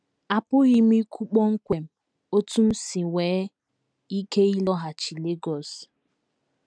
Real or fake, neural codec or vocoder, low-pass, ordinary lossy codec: real; none; 9.9 kHz; none